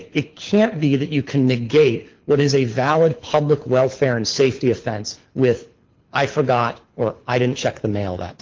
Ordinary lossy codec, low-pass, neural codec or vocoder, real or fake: Opus, 32 kbps; 7.2 kHz; codec, 24 kHz, 6 kbps, HILCodec; fake